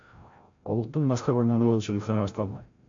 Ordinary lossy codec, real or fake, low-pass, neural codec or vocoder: MP3, 48 kbps; fake; 7.2 kHz; codec, 16 kHz, 0.5 kbps, FreqCodec, larger model